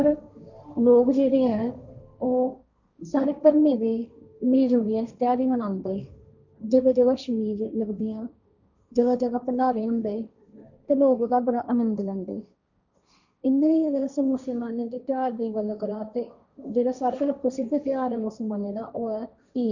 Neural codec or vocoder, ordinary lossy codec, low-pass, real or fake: codec, 16 kHz, 1.1 kbps, Voila-Tokenizer; none; 7.2 kHz; fake